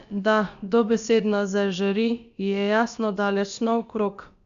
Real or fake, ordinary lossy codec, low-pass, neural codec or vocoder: fake; Opus, 64 kbps; 7.2 kHz; codec, 16 kHz, about 1 kbps, DyCAST, with the encoder's durations